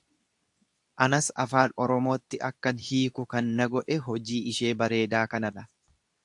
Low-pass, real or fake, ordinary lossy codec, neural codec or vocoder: 10.8 kHz; fake; AAC, 64 kbps; codec, 24 kHz, 0.9 kbps, WavTokenizer, medium speech release version 2